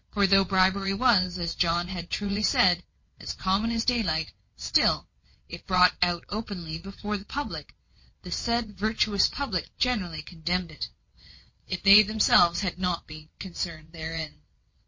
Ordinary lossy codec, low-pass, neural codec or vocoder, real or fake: MP3, 32 kbps; 7.2 kHz; vocoder, 22.05 kHz, 80 mel bands, WaveNeXt; fake